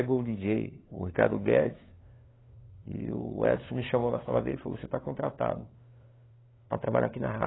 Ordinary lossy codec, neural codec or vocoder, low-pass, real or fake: AAC, 16 kbps; codec, 16 kHz, 2 kbps, FunCodec, trained on LibriTTS, 25 frames a second; 7.2 kHz; fake